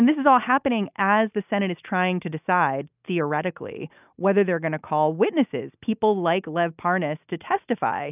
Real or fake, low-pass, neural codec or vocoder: real; 3.6 kHz; none